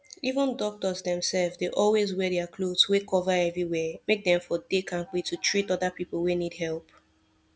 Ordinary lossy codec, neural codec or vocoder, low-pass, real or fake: none; none; none; real